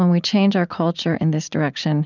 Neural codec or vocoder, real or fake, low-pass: vocoder, 44.1 kHz, 80 mel bands, Vocos; fake; 7.2 kHz